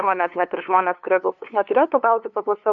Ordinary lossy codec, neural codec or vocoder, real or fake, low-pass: MP3, 64 kbps; codec, 16 kHz, 2 kbps, FunCodec, trained on LibriTTS, 25 frames a second; fake; 7.2 kHz